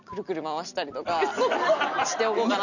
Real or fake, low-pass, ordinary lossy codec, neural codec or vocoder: real; 7.2 kHz; none; none